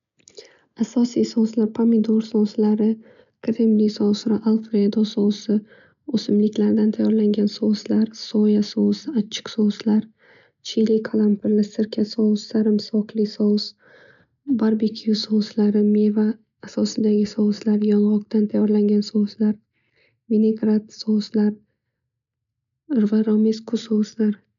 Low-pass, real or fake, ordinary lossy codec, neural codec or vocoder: 7.2 kHz; real; MP3, 96 kbps; none